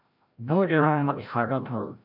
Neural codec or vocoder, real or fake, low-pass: codec, 16 kHz, 0.5 kbps, FreqCodec, larger model; fake; 5.4 kHz